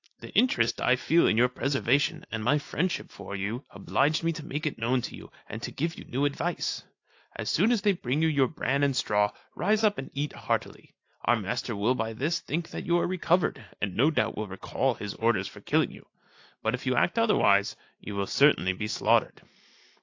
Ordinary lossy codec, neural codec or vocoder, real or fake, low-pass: AAC, 48 kbps; none; real; 7.2 kHz